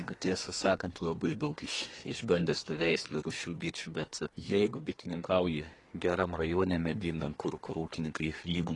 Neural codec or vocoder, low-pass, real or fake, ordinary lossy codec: codec, 24 kHz, 1 kbps, SNAC; 10.8 kHz; fake; AAC, 32 kbps